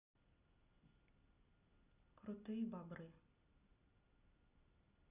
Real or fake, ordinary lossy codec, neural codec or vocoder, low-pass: real; none; none; 3.6 kHz